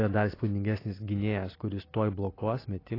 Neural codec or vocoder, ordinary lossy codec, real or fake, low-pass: none; AAC, 24 kbps; real; 5.4 kHz